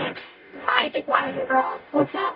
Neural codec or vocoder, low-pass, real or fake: codec, 44.1 kHz, 0.9 kbps, DAC; 5.4 kHz; fake